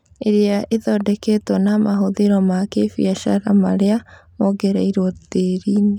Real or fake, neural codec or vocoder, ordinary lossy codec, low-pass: real; none; none; 19.8 kHz